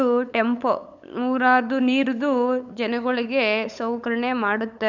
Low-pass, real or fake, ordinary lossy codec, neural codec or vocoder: 7.2 kHz; fake; none; codec, 16 kHz, 8 kbps, FunCodec, trained on LibriTTS, 25 frames a second